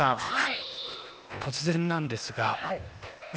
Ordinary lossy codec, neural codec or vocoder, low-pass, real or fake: none; codec, 16 kHz, 0.8 kbps, ZipCodec; none; fake